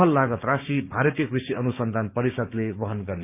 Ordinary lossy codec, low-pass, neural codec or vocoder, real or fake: none; 3.6 kHz; codec, 16 kHz, 6 kbps, DAC; fake